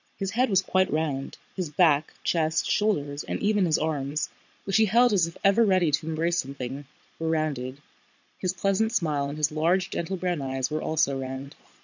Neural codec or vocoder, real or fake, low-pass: vocoder, 22.05 kHz, 80 mel bands, Vocos; fake; 7.2 kHz